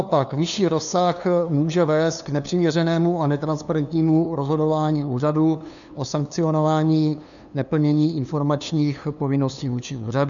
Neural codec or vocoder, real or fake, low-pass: codec, 16 kHz, 2 kbps, FunCodec, trained on LibriTTS, 25 frames a second; fake; 7.2 kHz